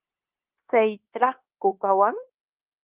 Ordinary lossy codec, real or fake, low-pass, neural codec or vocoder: Opus, 32 kbps; fake; 3.6 kHz; codec, 16 kHz, 0.9 kbps, LongCat-Audio-Codec